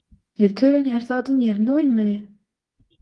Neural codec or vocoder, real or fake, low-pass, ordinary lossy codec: codec, 24 kHz, 0.9 kbps, WavTokenizer, medium music audio release; fake; 10.8 kHz; Opus, 24 kbps